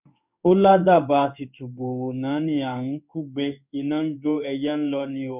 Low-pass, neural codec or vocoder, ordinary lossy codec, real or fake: 3.6 kHz; codec, 16 kHz in and 24 kHz out, 1 kbps, XY-Tokenizer; none; fake